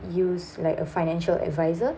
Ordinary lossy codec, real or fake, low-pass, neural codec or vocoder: none; real; none; none